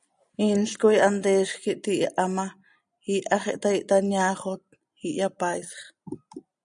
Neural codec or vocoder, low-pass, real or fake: none; 9.9 kHz; real